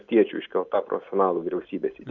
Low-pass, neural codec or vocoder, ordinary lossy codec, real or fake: 7.2 kHz; none; MP3, 64 kbps; real